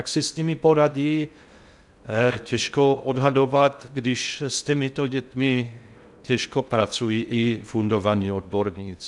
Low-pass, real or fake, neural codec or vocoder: 10.8 kHz; fake; codec, 16 kHz in and 24 kHz out, 0.6 kbps, FocalCodec, streaming, 2048 codes